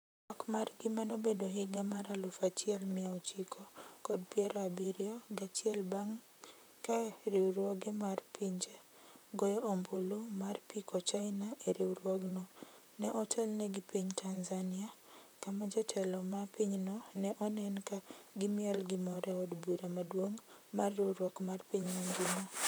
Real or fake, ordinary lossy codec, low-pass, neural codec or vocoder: fake; none; none; vocoder, 44.1 kHz, 128 mel bands, Pupu-Vocoder